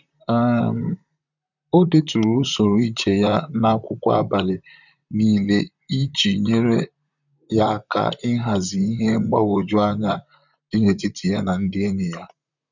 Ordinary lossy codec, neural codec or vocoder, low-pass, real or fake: none; vocoder, 44.1 kHz, 128 mel bands, Pupu-Vocoder; 7.2 kHz; fake